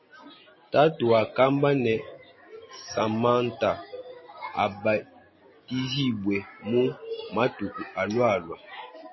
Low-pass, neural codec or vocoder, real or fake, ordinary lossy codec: 7.2 kHz; none; real; MP3, 24 kbps